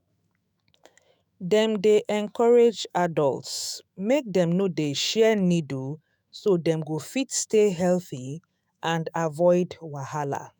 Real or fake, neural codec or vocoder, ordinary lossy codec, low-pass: fake; autoencoder, 48 kHz, 128 numbers a frame, DAC-VAE, trained on Japanese speech; none; none